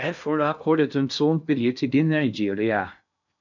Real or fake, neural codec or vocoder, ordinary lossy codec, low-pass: fake; codec, 16 kHz in and 24 kHz out, 0.6 kbps, FocalCodec, streaming, 2048 codes; none; 7.2 kHz